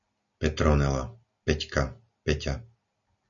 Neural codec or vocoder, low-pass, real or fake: none; 7.2 kHz; real